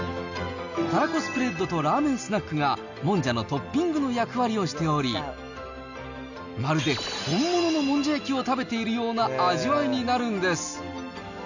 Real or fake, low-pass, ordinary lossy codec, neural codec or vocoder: real; 7.2 kHz; none; none